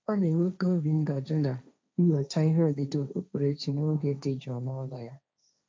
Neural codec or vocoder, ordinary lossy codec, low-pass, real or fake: codec, 16 kHz, 1.1 kbps, Voila-Tokenizer; none; none; fake